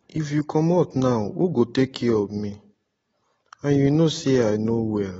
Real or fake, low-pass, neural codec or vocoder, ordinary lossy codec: real; 19.8 kHz; none; AAC, 24 kbps